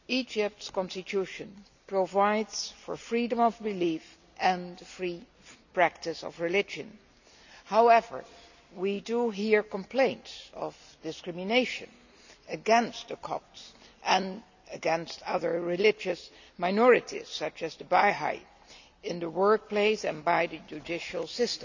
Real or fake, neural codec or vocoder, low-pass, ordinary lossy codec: real; none; 7.2 kHz; none